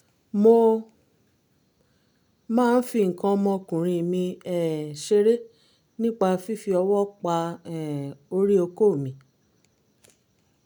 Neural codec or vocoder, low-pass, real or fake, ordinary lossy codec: none; 19.8 kHz; real; none